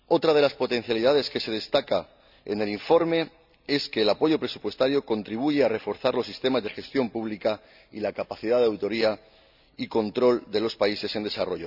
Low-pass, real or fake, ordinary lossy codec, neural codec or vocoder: 5.4 kHz; real; none; none